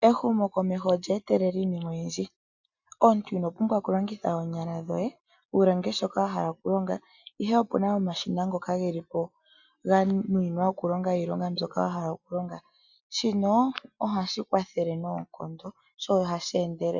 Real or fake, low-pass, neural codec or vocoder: real; 7.2 kHz; none